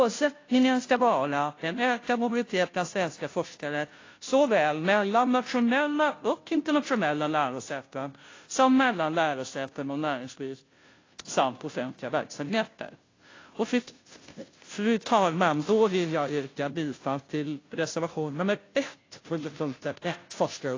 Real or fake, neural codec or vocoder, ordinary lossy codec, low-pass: fake; codec, 16 kHz, 0.5 kbps, FunCodec, trained on Chinese and English, 25 frames a second; AAC, 32 kbps; 7.2 kHz